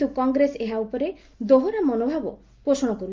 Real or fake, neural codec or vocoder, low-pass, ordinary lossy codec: real; none; 7.2 kHz; Opus, 32 kbps